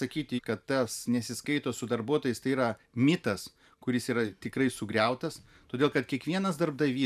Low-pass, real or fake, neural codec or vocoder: 14.4 kHz; fake; vocoder, 44.1 kHz, 128 mel bands every 512 samples, BigVGAN v2